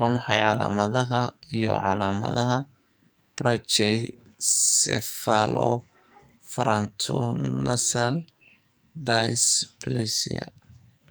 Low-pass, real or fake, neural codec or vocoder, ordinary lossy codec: none; fake; codec, 44.1 kHz, 2.6 kbps, SNAC; none